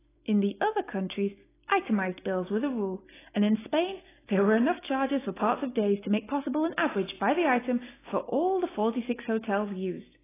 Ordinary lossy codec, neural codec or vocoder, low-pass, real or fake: AAC, 16 kbps; none; 3.6 kHz; real